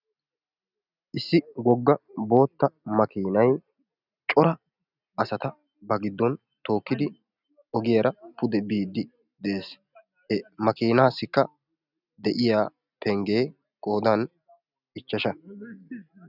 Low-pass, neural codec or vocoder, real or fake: 5.4 kHz; none; real